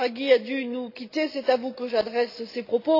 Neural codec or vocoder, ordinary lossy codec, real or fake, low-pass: none; AAC, 32 kbps; real; 5.4 kHz